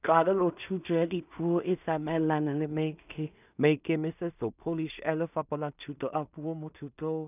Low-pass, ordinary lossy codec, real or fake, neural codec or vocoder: 3.6 kHz; none; fake; codec, 16 kHz in and 24 kHz out, 0.4 kbps, LongCat-Audio-Codec, two codebook decoder